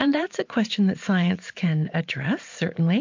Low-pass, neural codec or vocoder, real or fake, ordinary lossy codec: 7.2 kHz; vocoder, 44.1 kHz, 128 mel bands every 512 samples, BigVGAN v2; fake; MP3, 48 kbps